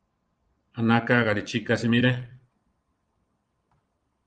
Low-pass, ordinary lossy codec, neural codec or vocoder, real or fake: 9.9 kHz; Opus, 24 kbps; vocoder, 22.05 kHz, 80 mel bands, Vocos; fake